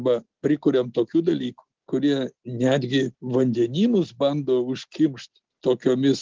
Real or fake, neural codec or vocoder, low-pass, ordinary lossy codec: real; none; 7.2 kHz; Opus, 16 kbps